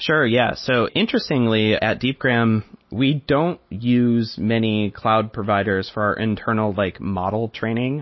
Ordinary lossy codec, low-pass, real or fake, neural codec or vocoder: MP3, 24 kbps; 7.2 kHz; real; none